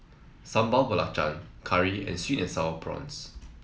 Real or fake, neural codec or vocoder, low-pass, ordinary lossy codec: real; none; none; none